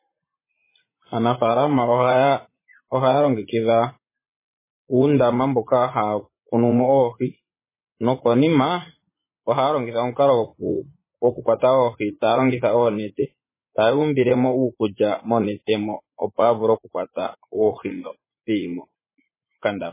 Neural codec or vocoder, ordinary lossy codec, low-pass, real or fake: vocoder, 44.1 kHz, 80 mel bands, Vocos; MP3, 16 kbps; 3.6 kHz; fake